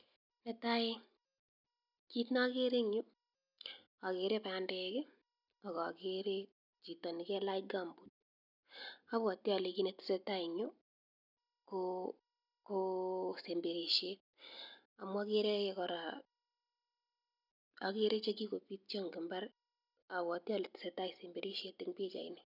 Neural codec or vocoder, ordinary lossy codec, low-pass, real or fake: none; none; 5.4 kHz; real